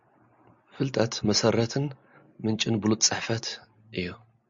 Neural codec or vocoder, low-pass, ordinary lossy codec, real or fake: none; 7.2 kHz; MP3, 48 kbps; real